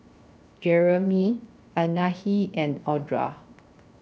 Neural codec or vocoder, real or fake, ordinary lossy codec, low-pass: codec, 16 kHz, 0.7 kbps, FocalCodec; fake; none; none